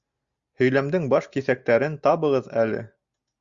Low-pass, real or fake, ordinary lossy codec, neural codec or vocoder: 7.2 kHz; real; Opus, 64 kbps; none